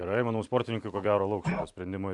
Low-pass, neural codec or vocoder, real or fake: 10.8 kHz; vocoder, 44.1 kHz, 128 mel bands every 256 samples, BigVGAN v2; fake